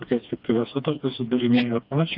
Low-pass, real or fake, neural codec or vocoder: 5.4 kHz; fake; codec, 16 kHz, 2 kbps, FreqCodec, smaller model